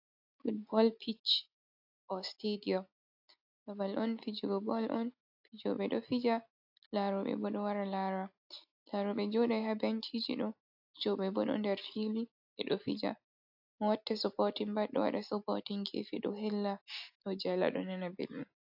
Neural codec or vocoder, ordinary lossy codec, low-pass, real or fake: none; AAC, 48 kbps; 5.4 kHz; real